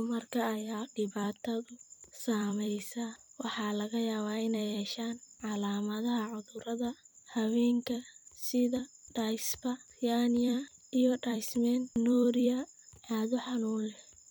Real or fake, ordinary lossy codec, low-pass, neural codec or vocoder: fake; none; none; vocoder, 44.1 kHz, 128 mel bands every 256 samples, BigVGAN v2